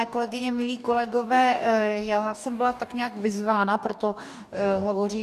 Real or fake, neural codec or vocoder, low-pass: fake; codec, 44.1 kHz, 2.6 kbps, DAC; 14.4 kHz